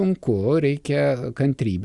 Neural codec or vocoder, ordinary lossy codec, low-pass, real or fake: none; Opus, 64 kbps; 10.8 kHz; real